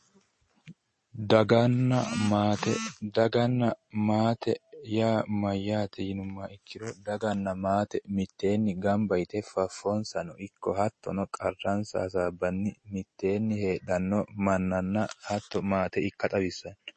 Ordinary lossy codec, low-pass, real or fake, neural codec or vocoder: MP3, 32 kbps; 10.8 kHz; real; none